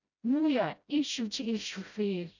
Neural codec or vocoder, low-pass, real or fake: codec, 16 kHz, 0.5 kbps, FreqCodec, smaller model; 7.2 kHz; fake